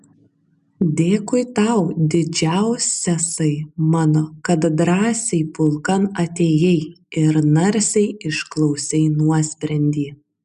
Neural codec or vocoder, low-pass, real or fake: none; 10.8 kHz; real